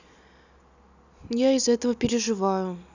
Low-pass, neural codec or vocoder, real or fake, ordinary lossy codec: 7.2 kHz; none; real; none